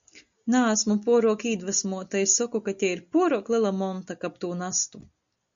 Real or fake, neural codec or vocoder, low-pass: real; none; 7.2 kHz